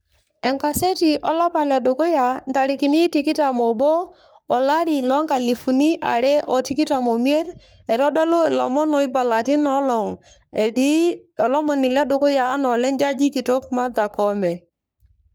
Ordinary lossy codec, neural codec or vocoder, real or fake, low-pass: none; codec, 44.1 kHz, 3.4 kbps, Pupu-Codec; fake; none